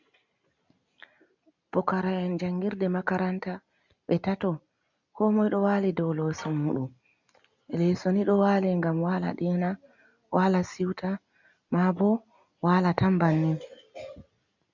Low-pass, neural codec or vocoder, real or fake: 7.2 kHz; none; real